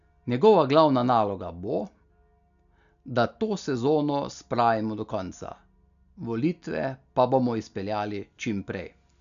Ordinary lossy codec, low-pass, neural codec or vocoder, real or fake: none; 7.2 kHz; none; real